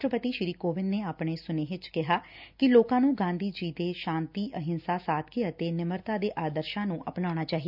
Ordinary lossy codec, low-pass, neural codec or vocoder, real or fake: none; 5.4 kHz; none; real